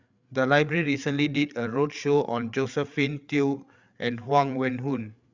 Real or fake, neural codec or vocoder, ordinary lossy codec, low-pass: fake; codec, 16 kHz, 8 kbps, FreqCodec, larger model; Opus, 64 kbps; 7.2 kHz